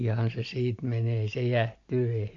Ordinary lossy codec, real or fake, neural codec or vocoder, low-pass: AAC, 48 kbps; real; none; 7.2 kHz